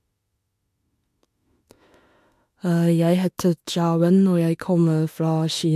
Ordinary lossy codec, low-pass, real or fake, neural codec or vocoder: MP3, 64 kbps; 14.4 kHz; fake; autoencoder, 48 kHz, 32 numbers a frame, DAC-VAE, trained on Japanese speech